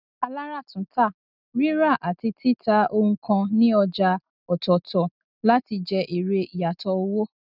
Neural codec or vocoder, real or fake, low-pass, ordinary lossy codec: none; real; 5.4 kHz; none